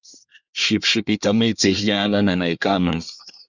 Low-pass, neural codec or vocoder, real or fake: 7.2 kHz; codec, 24 kHz, 1 kbps, SNAC; fake